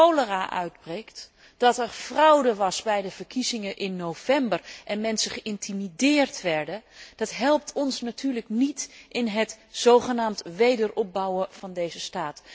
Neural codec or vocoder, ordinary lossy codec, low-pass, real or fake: none; none; none; real